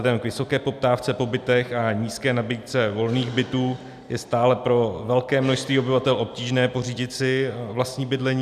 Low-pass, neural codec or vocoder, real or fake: 14.4 kHz; none; real